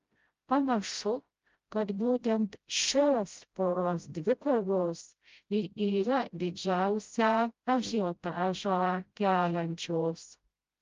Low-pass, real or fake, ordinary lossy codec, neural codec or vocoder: 7.2 kHz; fake; Opus, 32 kbps; codec, 16 kHz, 0.5 kbps, FreqCodec, smaller model